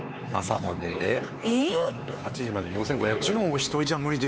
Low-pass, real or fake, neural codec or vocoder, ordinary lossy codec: none; fake; codec, 16 kHz, 4 kbps, X-Codec, HuBERT features, trained on LibriSpeech; none